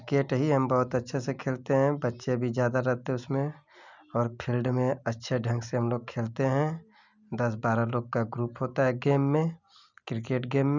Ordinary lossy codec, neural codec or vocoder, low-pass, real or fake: none; none; 7.2 kHz; real